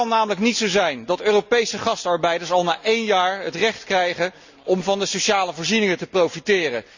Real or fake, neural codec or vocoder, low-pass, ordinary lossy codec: real; none; 7.2 kHz; Opus, 64 kbps